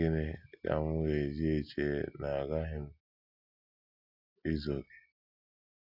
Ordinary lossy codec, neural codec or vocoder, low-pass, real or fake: AAC, 48 kbps; none; 5.4 kHz; real